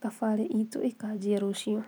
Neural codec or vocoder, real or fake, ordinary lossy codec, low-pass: none; real; none; none